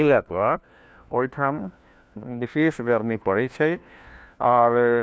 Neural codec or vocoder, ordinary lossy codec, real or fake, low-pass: codec, 16 kHz, 1 kbps, FunCodec, trained on LibriTTS, 50 frames a second; none; fake; none